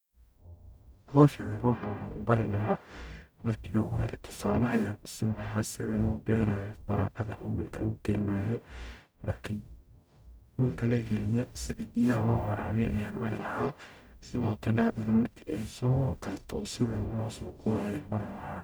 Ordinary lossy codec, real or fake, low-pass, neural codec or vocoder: none; fake; none; codec, 44.1 kHz, 0.9 kbps, DAC